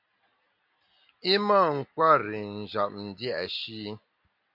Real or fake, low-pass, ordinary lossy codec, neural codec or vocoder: real; 5.4 kHz; MP3, 48 kbps; none